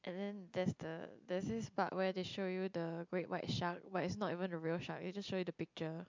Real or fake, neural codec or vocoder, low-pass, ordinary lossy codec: real; none; 7.2 kHz; MP3, 64 kbps